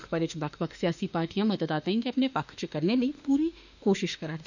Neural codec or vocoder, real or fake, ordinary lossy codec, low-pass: autoencoder, 48 kHz, 32 numbers a frame, DAC-VAE, trained on Japanese speech; fake; none; 7.2 kHz